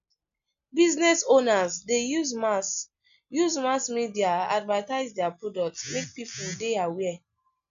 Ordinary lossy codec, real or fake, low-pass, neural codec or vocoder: none; real; 7.2 kHz; none